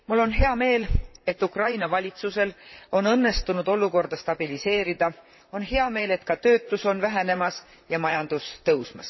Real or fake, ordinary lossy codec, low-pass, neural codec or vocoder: fake; MP3, 24 kbps; 7.2 kHz; vocoder, 44.1 kHz, 128 mel bands, Pupu-Vocoder